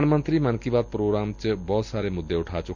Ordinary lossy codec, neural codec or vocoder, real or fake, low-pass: none; none; real; 7.2 kHz